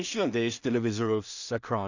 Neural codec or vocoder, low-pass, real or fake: codec, 16 kHz in and 24 kHz out, 0.4 kbps, LongCat-Audio-Codec, two codebook decoder; 7.2 kHz; fake